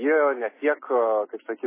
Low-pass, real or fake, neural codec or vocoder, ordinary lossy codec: 3.6 kHz; real; none; MP3, 16 kbps